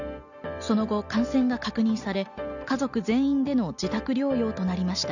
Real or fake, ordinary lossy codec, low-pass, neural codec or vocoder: real; none; 7.2 kHz; none